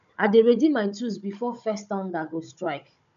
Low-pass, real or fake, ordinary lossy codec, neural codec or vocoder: 7.2 kHz; fake; MP3, 96 kbps; codec, 16 kHz, 16 kbps, FunCodec, trained on Chinese and English, 50 frames a second